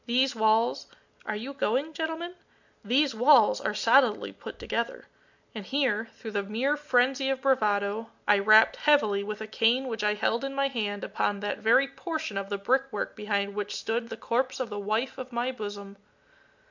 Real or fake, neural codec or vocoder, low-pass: real; none; 7.2 kHz